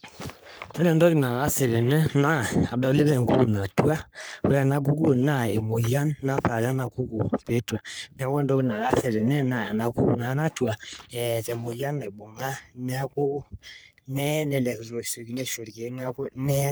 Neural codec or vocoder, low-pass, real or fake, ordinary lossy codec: codec, 44.1 kHz, 3.4 kbps, Pupu-Codec; none; fake; none